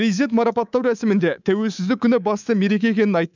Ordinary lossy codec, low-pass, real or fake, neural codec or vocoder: none; 7.2 kHz; fake; codec, 24 kHz, 3.1 kbps, DualCodec